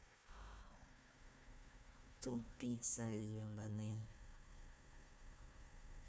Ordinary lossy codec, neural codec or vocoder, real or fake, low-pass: none; codec, 16 kHz, 1 kbps, FunCodec, trained on Chinese and English, 50 frames a second; fake; none